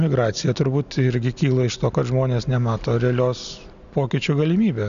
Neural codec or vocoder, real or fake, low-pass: none; real; 7.2 kHz